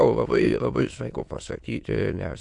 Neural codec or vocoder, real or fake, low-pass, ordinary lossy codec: autoencoder, 22.05 kHz, a latent of 192 numbers a frame, VITS, trained on many speakers; fake; 9.9 kHz; MP3, 64 kbps